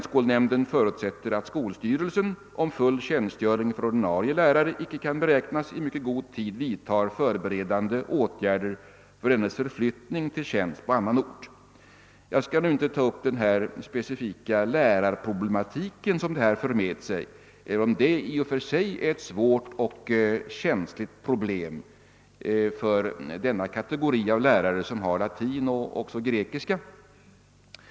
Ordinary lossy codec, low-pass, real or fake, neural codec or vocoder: none; none; real; none